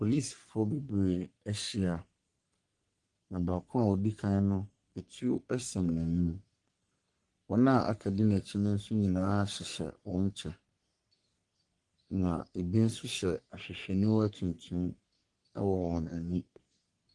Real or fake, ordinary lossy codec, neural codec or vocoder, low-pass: fake; Opus, 24 kbps; codec, 44.1 kHz, 3.4 kbps, Pupu-Codec; 10.8 kHz